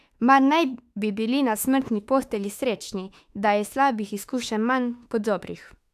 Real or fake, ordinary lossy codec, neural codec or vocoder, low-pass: fake; AAC, 96 kbps; autoencoder, 48 kHz, 32 numbers a frame, DAC-VAE, trained on Japanese speech; 14.4 kHz